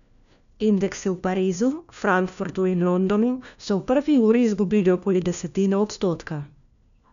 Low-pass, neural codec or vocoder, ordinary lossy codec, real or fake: 7.2 kHz; codec, 16 kHz, 1 kbps, FunCodec, trained on LibriTTS, 50 frames a second; none; fake